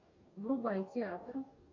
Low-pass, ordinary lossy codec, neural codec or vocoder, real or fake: 7.2 kHz; AAC, 48 kbps; codec, 44.1 kHz, 2.6 kbps, DAC; fake